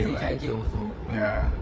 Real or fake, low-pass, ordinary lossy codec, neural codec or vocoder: fake; none; none; codec, 16 kHz, 8 kbps, FreqCodec, larger model